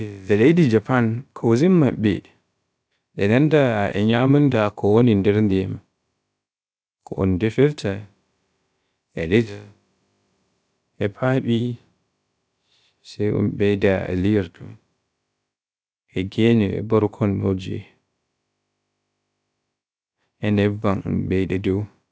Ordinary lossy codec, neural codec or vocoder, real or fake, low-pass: none; codec, 16 kHz, about 1 kbps, DyCAST, with the encoder's durations; fake; none